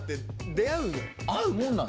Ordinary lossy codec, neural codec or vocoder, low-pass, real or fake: none; none; none; real